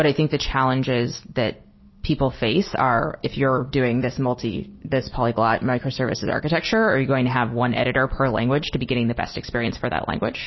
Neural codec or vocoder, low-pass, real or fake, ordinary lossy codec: none; 7.2 kHz; real; MP3, 24 kbps